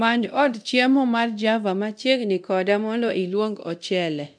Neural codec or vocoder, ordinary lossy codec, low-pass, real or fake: codec, 24 kHz, 0.9 kbps, DualCodec; none; 9.9 kHz; fake